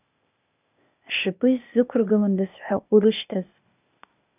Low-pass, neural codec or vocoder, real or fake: 3.6 kHz; codec, 16 kHz, 0.8 kbps, ZipCodec; fake